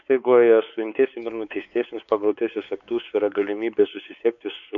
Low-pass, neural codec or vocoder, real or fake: 7.2 kHz; codec, 16 kHz, 4 kbps, X-Codec, WavLM features, trained on Multilingual LibriSpeech; fake